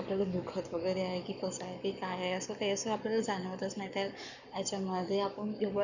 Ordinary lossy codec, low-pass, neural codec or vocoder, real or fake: none; 7.2 kHz; codec, 44.1 kHz, 7.8 kbps, Pupu-Codec; fake